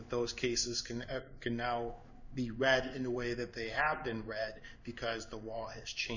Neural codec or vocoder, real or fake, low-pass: codec, 16 kHz in and 24 kHz out, 1 kbps, XY-Tokenizer; fake; 7.2 kHz